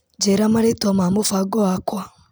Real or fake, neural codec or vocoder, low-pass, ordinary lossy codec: real; none; none; none